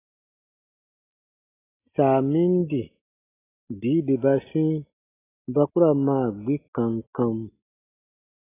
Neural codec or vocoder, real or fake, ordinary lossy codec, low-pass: none; real; AAC, 16 kbps; 3.6 kHz